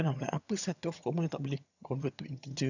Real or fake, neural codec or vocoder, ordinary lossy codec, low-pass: fake; vocoder, 22.05 kHz, 80 mel bands, HiFi-GAN; none; 7.2 kHz